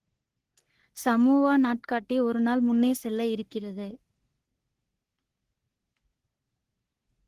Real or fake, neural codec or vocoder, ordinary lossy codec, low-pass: fake; codec, 44.1 kHz, 3.4 kbps, Pupu-Codec; Opus, 16 kbps; 14.4 kHz